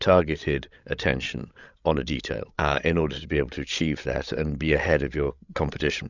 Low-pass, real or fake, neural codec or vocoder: 7.2 kHz; fake; codec, 16 kHz, 16 kbps, FreqCodec, larger model